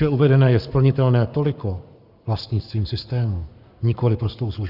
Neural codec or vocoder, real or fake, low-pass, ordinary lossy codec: codec, 44.1 kHz, 7.8 kbps, Pupu-Codec; fake; 5.4 kHz; Opus, 64 kbps